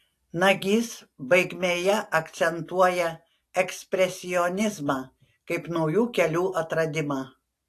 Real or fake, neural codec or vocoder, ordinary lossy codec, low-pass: real; none; AAC, 64 kbps; 14.4 kHz